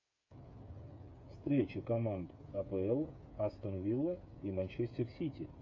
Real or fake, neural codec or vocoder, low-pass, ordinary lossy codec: fake; codec, 16 kHz, 8 kbps, FreqCodec, smaller model; 7.2 kHz; MP3, 48 kbps